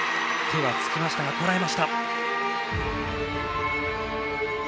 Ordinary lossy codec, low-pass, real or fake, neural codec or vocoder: none; none; real; none